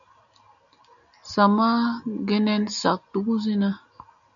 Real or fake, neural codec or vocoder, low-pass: real; none; 7.2 kHz